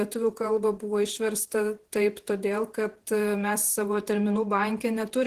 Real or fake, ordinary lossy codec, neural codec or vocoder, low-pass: fake; Opus, 16 kbps; vocoder, 48 kHz, 128 mel bands, Vocos; 14.4 kHz